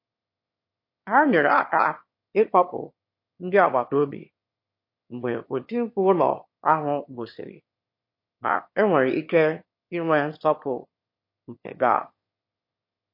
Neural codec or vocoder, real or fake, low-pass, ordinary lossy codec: autoencoder, 22.05 kHz, a latent of 192 numbers a frame, VITS, trained on one speaker; fake; 5.4 kHz; MP3, 32 kbps